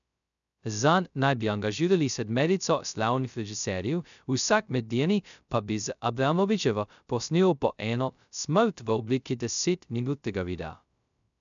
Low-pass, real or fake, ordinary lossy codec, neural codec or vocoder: 7.2 kHz; fake; none; codec, 16 kHz, 0.2 kbps, FocalCodec